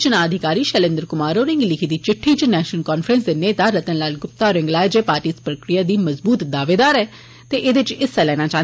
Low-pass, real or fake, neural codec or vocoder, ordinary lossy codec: none; real; none; none